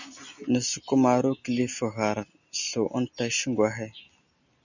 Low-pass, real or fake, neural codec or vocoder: 7.2 kHz; real; none